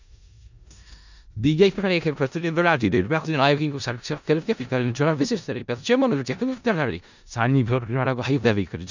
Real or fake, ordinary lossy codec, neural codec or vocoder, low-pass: fake; none; codec, 16 kHz in and 24 kHz out, 0.4 kbps, LongCat-Audio-Codec, four codebook decoder; 7.2 kHz